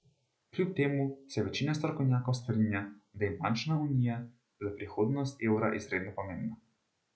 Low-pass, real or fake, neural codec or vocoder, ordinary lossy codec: none; real; none; none